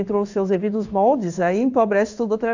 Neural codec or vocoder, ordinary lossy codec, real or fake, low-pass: codec, 16 kHz in and 24 kHz out, 1 kbps, XY-Tokenizer; none; fake; 7.2 kHz